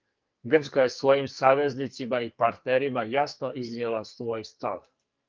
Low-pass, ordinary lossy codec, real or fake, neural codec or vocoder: 7.2 kHz; Opus, 24 kbps; fake; codec, 44.1 kHz, 2.6 kbps, SNAC